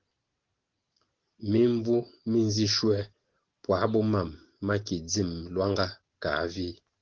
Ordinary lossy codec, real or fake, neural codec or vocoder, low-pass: Opus, 16 kbps; real; none; 7.2 kHz